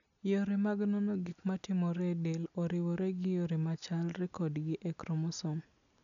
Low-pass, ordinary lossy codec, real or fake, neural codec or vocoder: 7.2 kHz; none; real; none